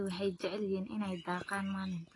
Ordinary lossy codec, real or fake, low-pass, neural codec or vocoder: AAC, 32 kbps; real; 10.8 kHz; none